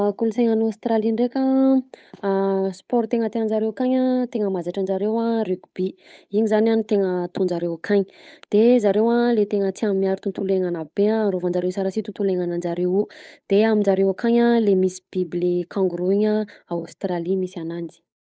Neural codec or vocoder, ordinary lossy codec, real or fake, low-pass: codec, 16 kHz, 8 kbps, FunCodec, trained on Chinese and English, 25 frames a second; none; fake; none